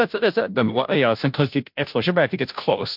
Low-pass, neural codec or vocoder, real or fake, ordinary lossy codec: 5.4 kHz; codec, 16 kHz, 0.5 kbps, FunCodec, trained on Chinese and English, 25 frames a second; fake; MP3, 48 kbps